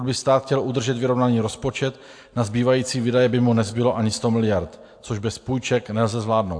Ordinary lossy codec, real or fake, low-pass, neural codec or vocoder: AAC, 64 kbps; real; 9.9 kHz; none